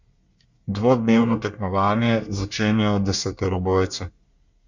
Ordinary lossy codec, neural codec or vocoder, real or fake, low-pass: Opus, 64 kbps; codec, 24 kHz, 1 kbps, SNAC; fake; 7.2 kHz